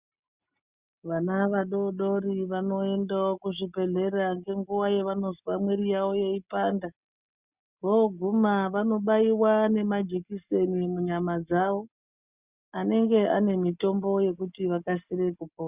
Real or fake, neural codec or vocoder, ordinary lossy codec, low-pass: real; none; Opus, 64 kbps; 3.6 kHz